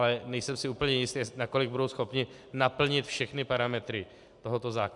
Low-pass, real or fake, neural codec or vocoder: 10.8 kHz; real; none